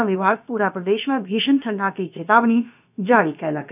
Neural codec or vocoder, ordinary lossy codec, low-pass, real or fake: codec, 16 kHz, about 1 kbps, DyCAST, with the encoder's durations; none; 3.6 kHz; fake